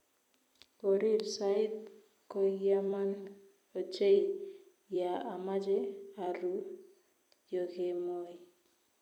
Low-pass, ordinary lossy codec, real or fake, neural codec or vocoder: 19.8 kHz; none; fake; vocoder, 44.1 kHz, 128 mel bands every 256 samples, BigVGAN v2